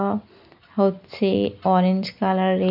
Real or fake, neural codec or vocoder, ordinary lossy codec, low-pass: real; none; none; 5.4 kHz